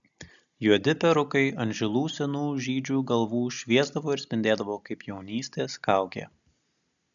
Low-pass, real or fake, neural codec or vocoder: 7.2 kHz; real; none